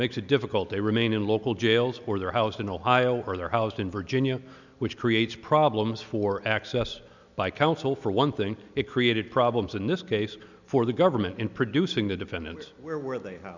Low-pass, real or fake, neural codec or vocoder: 7.2 kHz; real; none